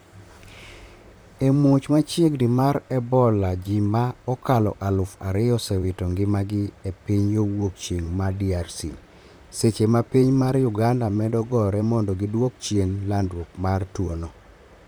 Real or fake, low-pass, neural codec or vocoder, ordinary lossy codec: fake; none; vocoder, 44.1 kHz, 128 mel bands, Pupu-Vocoder; none